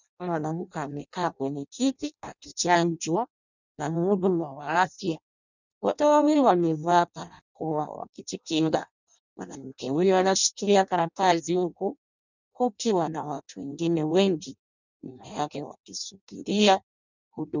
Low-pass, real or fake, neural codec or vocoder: 7.2 kHz; fake; codec, 16 kHz in and 24 kHz out, 0.6 kbps, FireRedTTS-2 codec